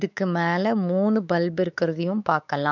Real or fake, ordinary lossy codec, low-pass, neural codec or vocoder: fake; none; 7.2 kHz; codec, 16 kHz, 4 kbps, X-Codec, WavLM features, trained on Multilingual LibriSpeech